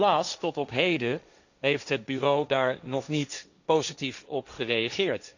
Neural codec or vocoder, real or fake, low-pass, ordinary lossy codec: codec, 16 kHz, 1.1 kbps, Voila-Tokenizer; fake; 7.2 kHz; none